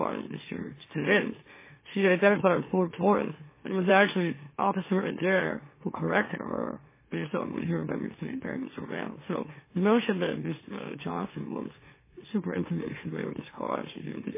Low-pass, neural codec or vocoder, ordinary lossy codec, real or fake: 3.6 kHz; autoencoder, 44.1 kHz, a latent of 192 numbers a frame, MeloTTS; MP3, 16 kbps; fake